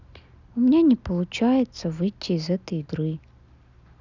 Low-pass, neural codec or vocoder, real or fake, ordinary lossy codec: 7.2 kHz; none; real; none